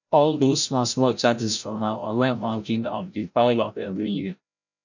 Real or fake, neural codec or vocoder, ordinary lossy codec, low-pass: fake; codec, 16 kHz, 0.5 kbps, FreqCodec, larger model; none; 7.2 kHz